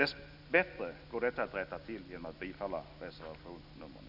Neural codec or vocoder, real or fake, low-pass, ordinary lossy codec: none; real; 5.4 kHz; none